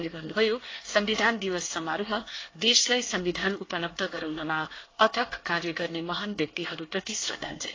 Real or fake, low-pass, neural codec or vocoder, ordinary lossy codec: fake; 7.2 kHz; codec, 24 kHz, 1 kbps, SNAC; AAC, 32 kbps